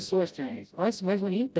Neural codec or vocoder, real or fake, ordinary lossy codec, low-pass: codec, 16 kHz, 0.5 kbps, FreqCodec, smaller model; fake; none; none